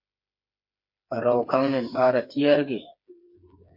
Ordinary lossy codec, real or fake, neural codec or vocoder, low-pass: MP3, 32 kbps; fake; codec, 16 kHz, 4 kbps, FreqCodec, smaller model; 5.4 kHz